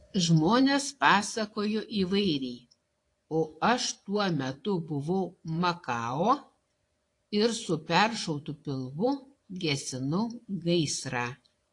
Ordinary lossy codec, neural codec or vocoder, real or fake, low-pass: AAC, 48 kbps; vocoder, 24 kHz, 100 mel bands, Vocos; fake; 10.8 kHz